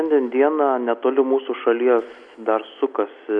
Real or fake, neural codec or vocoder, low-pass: real; none; 9.9 kHz